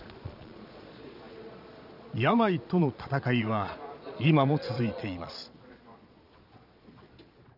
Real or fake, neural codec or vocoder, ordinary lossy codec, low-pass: fake; vocoder, 22.05 kHz, 80 mel bands, Vocos; none; 5.4 kHz